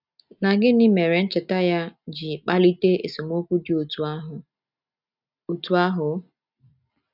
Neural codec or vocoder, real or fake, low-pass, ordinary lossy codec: none; real; 5.4 kHz; none